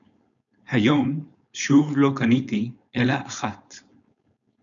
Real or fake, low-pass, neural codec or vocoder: fake; 7.2 kHz; codec, 16 kHz, 4.8 kbps, FACodec